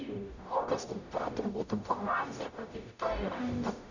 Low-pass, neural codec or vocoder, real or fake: 7.2 kHz; codec, 44.1 kHz, 0.9 kbps, DAC; fake